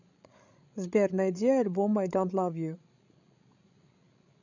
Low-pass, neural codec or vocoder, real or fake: 7.2 kHz; codec, 16 kHz, 16 kbps, FreqCodec, larger model; fake